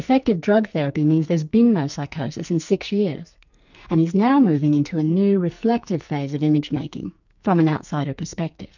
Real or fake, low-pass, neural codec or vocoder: fake; 7.2 kHz; codec, 44.1 kHz, 2.6 kbps, SNAC